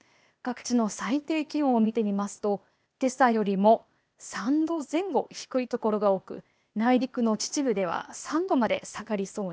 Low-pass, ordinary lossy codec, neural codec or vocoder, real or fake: none; none; codec, 16 kHz, 0.8 kbps, ZipCodec; fake